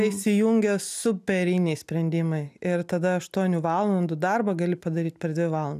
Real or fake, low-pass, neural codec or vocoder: real; 14.4 kHz; none